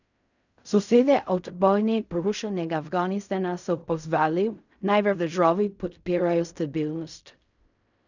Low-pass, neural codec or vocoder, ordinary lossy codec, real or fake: 7.2 kHz; codec, 16 kHz in and 24 kHz out, 0.4 kbps, LongCat-Audio-Codec, fine tuned four codebook decoder; none; fake